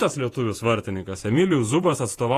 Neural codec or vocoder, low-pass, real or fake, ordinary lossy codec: vocoder, 48 kHz, 128 mel bands, Vocos; 14.4 kHz; fake; AAC, 48 kbps